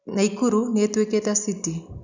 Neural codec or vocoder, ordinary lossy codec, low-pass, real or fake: none; none; 7.2 kHz; real